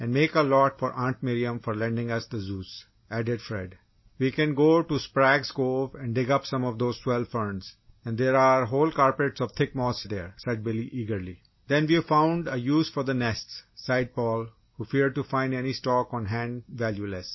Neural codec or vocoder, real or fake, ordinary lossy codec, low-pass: none; real; MP3, 24 kbps; 7.2 kHz